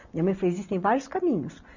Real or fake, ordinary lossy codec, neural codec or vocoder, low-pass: real; none; none; 7.2 kHz